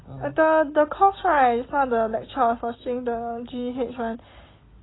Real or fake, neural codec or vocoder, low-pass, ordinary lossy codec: real; none; 7.2 kHz; AAC, 16 kbps